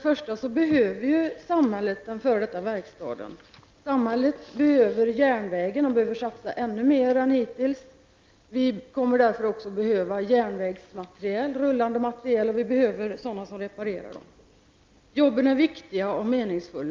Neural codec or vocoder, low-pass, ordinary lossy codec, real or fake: none; 7.2 kHz; Opus, 24 kbps; real